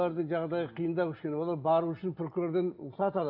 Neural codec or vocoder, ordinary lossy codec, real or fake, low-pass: none; none; real; 5.4 kHz